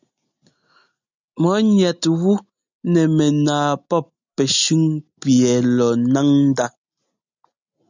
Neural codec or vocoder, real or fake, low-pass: none; real; 7.2 kHz